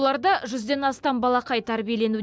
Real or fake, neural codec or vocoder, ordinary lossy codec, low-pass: real; none; none; none